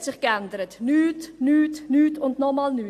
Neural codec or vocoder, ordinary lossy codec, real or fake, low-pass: none; AAC, 64 kbps; real; 14.4 kHz